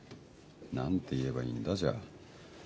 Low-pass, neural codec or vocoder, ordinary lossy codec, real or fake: none; none; none; real